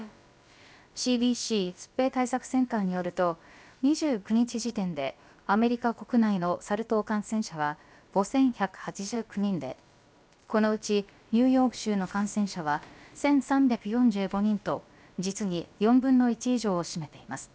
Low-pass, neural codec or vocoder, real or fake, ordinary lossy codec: none; codec, 16 kHz, about 1 kbps, DyCAST, with the encoder's durations; fake; none